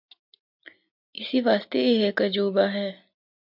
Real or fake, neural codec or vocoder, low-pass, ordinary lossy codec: real; none; 5.4 kHz; MP3, 48 kbps